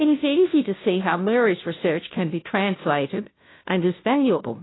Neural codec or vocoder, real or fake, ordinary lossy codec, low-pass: codec, 16 kHz, 0.5 kbps, FunCodec, trained on Chinese and English, 25 frames a second; fake; AAC, 16 kbps; 7.2 kHz